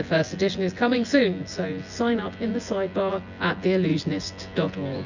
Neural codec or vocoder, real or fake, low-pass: vocoder, 24 kHz, 100 mel bands, Vocos; fake; 7.2 kHz